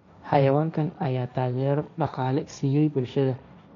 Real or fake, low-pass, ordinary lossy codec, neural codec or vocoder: fake; 7.2 kHz; none; codec, 16 kHz, 1.1 kbps, Voila-Tokenizer